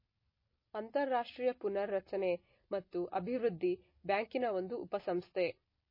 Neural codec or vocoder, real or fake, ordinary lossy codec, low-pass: none; real; MP3, 24 kbps; 5.4 kHz